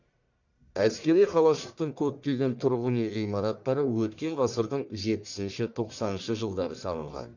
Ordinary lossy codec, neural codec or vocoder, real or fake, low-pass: none; codec, 44.1 kHz, 1.7 kbps, Pupu-Codec; fake; 7.2 kHz